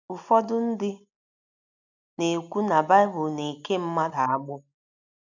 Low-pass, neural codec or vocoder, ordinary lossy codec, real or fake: 7.2 kHz; none; none; real